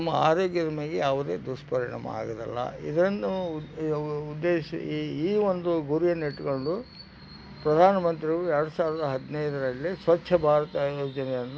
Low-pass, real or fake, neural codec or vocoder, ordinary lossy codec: none; real; none; none